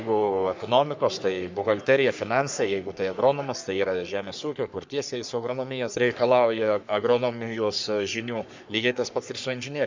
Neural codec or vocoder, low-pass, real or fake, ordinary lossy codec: codec, 44.1 kHz, 3.4 kbps, Pupu-Codec; 7.2 kHz; fake; MP3, 64 kbps